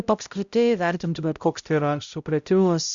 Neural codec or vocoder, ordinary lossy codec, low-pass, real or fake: codec, 16 kHz, 0.5 kbps, X-Codec, HuBERT features, trained on balanced general audio; Opus, 64 kbps; 7.2 kHz; fake